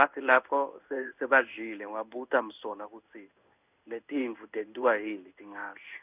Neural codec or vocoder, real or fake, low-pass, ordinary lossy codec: codec, 16 kHz in and 24 kHz out, 1 kbps, XY-Tokenizer; fake; 3.6 kHz; none